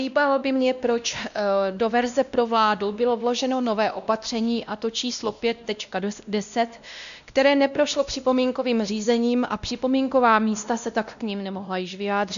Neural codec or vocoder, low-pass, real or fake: codec, 16 kHz, 1 kbps, X-Codec, WavLM features, trained on Multilingual LibriSpeech; 7.2 kHz; fake